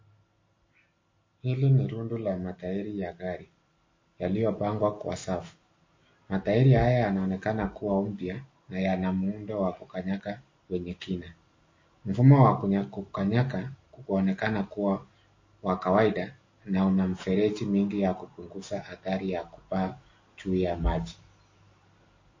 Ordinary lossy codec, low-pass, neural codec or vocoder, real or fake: MP3, 32 kbps; 7.2 kHz; none; real